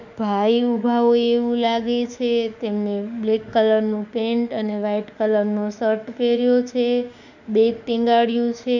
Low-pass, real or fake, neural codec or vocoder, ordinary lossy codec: 7.2 kHz; fake; autoencoder, 48 kHz, 32 numbers a frame, DAC-VAE, trained on Japanese speech; none